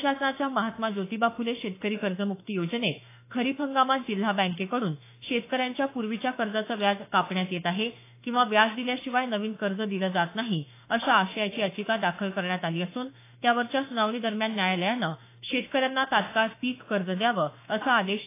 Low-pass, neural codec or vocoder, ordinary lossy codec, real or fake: 3.6 kHz; autoencoder, 48 kHz, 32 numbers a frame, DAC-VAE, trained on Japanese speech; AAC, 24 kbps; fake